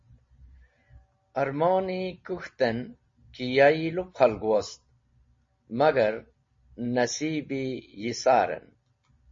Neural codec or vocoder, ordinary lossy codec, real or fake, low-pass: none; MP3, 32 kbps; real; 7.2 kHz